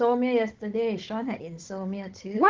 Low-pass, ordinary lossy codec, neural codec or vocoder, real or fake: 7.2 kHz; Opus, 16 kbps; codec, 16 kHz, 16 kbps, FunCodec, trained on Chinese and English, 50 frames a second; fake